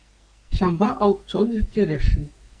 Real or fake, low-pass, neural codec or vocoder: fake; 9.9 kHz; codec, 44.1 kHz, 2.6 kbps, SNAC